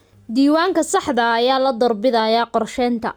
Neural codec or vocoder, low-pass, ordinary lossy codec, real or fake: none; none; none; real